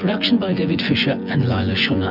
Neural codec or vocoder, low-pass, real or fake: vocoder, 24 kHz, 100 mel bands, Vocos; 5.4 kHz; fake